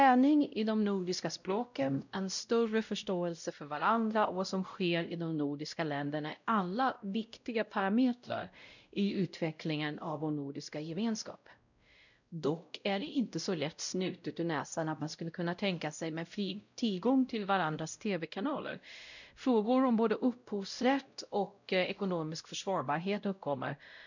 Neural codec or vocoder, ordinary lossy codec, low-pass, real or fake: codec, 16 kHz, 0.5 kbps, X-Codec, WavLM features, trained on Multilingual LibriSpeech; none; 7.2 kHz; fake